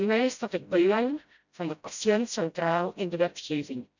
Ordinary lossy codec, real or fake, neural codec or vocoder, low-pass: none; fake; codec, 16 kHz, 0.5 kbps, FreqCodec, smaller model; 7.2 kHz